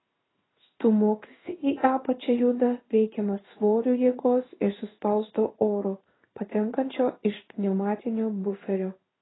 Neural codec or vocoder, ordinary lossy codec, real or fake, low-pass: codec, 16 kHz in and 24 kHz out, 1 kbps, XY-Tokenizer; AAC, 16 kbps; fake; 7.2 kHz